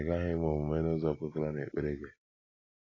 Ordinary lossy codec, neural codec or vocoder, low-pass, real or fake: AAC, 32 kbps; none; 7.2 kHz; real